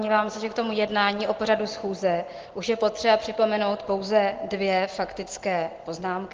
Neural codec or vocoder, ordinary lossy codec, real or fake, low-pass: none; Opus, 16 kbps; real; 7.2 kHz